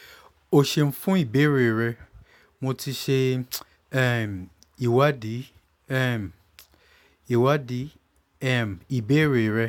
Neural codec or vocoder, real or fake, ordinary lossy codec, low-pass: none; real; none; 19.8 kHz